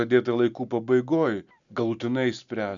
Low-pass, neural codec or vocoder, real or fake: 7.2 kHz; none; real